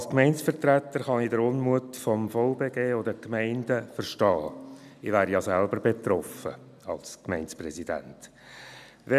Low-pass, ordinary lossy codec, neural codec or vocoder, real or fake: 14.4 kHz; none; none; real